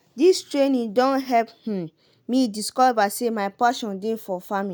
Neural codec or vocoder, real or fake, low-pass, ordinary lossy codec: none; real; none; none